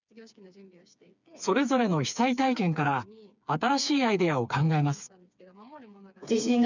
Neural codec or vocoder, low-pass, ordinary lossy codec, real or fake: codec, 16 kHz, 4 kbps, FreqCodec, smaller model; 7.2 kHz; none; fake